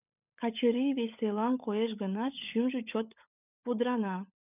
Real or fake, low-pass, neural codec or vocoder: fake; 3.6 kHz; codec, 16 kHz, 16 kbps, FunCodec, trained on LibriTTS, 50 frames a second